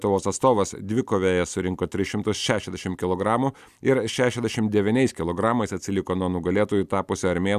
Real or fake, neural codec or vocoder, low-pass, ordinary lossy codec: real; none; 14.4 kHz; Opus, 64 kbps